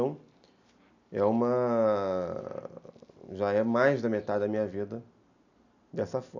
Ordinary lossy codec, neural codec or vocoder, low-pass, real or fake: none; none; 7.2 kHz; real